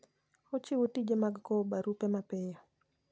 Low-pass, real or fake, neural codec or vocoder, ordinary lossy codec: none; real; none; none